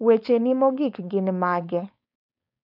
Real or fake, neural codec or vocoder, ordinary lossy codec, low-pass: fake; codec, 16 kHz, 4.8 kbps, FACodec; none; 5.4 kHz